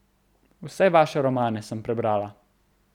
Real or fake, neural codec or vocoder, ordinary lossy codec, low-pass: real; none; none; 19.8 kHz